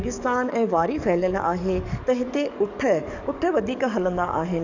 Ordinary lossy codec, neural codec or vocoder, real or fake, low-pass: none; codec, 44.1 kHz, 7.8 kbps, DAC; fake; 7.2 kHz